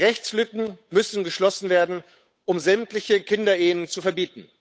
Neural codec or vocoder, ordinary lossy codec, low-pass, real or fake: codec, 16 kHz, 8 kbps, FunCodec, trained on Chinese and English, 25 frames a second; none; none; fake